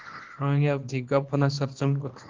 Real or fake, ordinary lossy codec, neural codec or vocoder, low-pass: fake; Opus, 16 kbps; codec, 24 kHz, 0.9 kbps, WavTokenizer, small release; 7.2 kHz